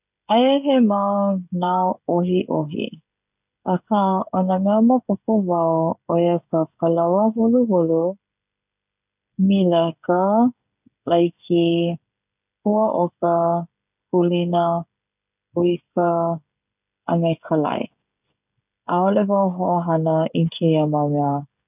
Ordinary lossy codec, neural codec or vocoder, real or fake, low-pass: none; codec, 16 kHz, 16 kbps, FreqCodec, smaller model; fake; 3.6 kHz